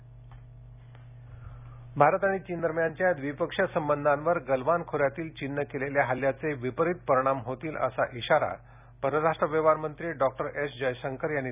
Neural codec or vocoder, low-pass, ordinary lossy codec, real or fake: none; 3.6 kHz; none; real